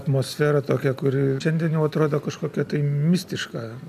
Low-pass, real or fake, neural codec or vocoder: 14.4 kHz; real; none